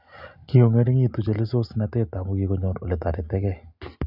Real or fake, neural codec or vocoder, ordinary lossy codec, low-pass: real; none; none; 5.4 kHz